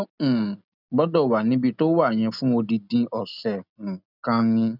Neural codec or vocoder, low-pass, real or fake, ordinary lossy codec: none; 5.4 kHz; real; none